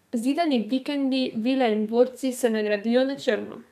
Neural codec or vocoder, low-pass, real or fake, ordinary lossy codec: codec, 32 kHz, 1.9 kbps, SNAC; 14.4 kHz; fake; none